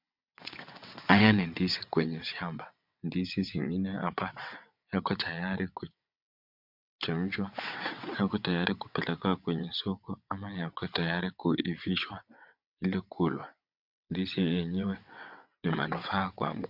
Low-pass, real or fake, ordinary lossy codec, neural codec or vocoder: 5.4 kHz; fake; MP3, 48 kbps; vocoder, 22.05 kHz, 80 mel bands, WaveNeXt